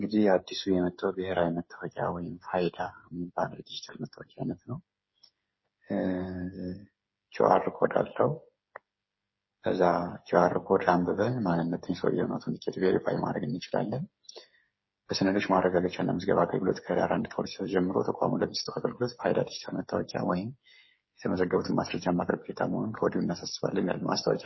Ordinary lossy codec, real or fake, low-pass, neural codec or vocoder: MP3, 24 kbps; fake; 7.2 kHz; codec, 16 kHz, 8 kbps, FreqCodec, smaller model